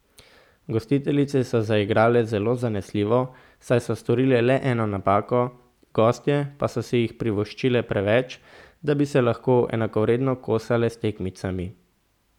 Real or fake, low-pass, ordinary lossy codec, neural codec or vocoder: real; 19.8 kHz; none; none